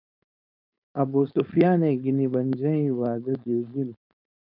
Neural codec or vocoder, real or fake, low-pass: codec, 16 kHz, 4.8 kbps, FACodec; fake; 5.4 kHz